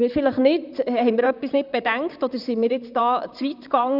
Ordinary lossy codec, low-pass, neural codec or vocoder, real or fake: none; 5.4 kHz; vocoder, 44.1 kHz, 128 mel bands, Pupu-Vocoder; fake